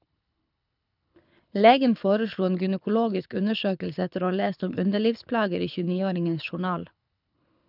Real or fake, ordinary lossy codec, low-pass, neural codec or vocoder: fake; none; 5.4 kHz; codec, 24 kHz, 6 kbps, HILCodec